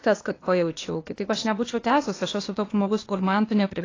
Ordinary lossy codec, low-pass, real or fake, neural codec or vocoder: AAC, 32 kbps; 7.2 kHz; fake; codec, 16 kHz, 0.8 kbps, ZipCodec